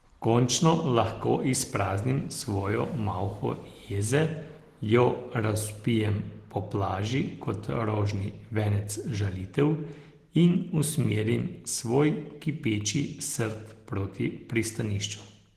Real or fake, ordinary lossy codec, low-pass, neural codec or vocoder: real; Opus, 16 kbps; 14.4 kHz; none